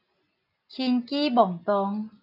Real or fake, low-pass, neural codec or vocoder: real; 5.4 kHz; none